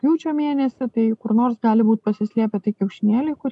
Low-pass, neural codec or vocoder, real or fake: 10.8 kHz; none; real